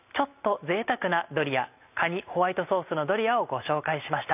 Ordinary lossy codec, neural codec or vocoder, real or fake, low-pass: none; none; real; 3.6 kHz